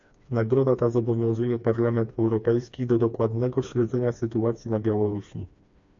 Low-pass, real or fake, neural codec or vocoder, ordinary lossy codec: 7.2 kHz; fake; codec, 16 kHz, 2 kbps, FreqCodec, smaller model; AAC, 64 kbps